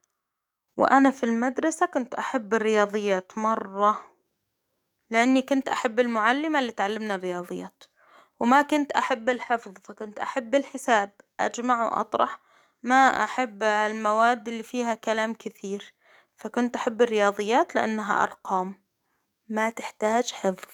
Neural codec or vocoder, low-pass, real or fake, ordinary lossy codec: codec, 44.1 kHz, 7.8 kbps, DAC; 19.8 kHz; fake; none